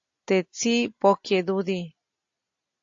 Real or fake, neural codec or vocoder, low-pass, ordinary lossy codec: real; none; 7.2 kHz; AAC, 48 kbps